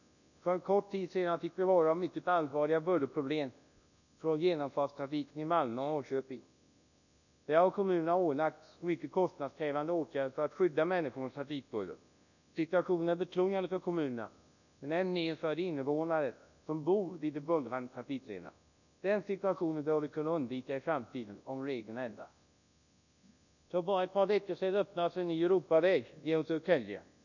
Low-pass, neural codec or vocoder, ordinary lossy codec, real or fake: 7.2 kHz; codec, 24 kHz, 0.9 kbps, WavTokenizer, large speech release; none; fake